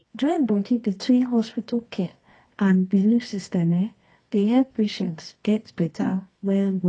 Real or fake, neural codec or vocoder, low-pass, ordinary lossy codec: fake; codec, 24 kHz, 0.9 kbps, WavTokenizer, medium music audio release; 10.8 kHz; AAC, 32 kbps